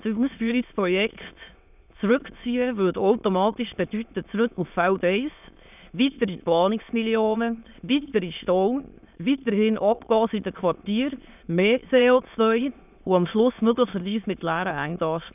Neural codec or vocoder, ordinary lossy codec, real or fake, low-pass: autoencoder, 22.05 kHz, a latent of 192 numbers a frame, VITS, trained on many speakers; none; fake; 3.6 kHz